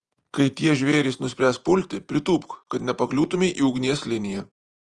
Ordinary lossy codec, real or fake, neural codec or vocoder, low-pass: Opus, 24 kbps; fake; vocoder, 48 kHz, 128 mel bands, Vocos; 10.8 kHz